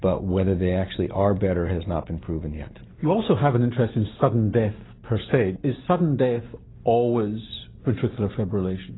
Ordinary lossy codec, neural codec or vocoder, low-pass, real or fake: AAC, 16 kbps; none; 7.2 kHz; real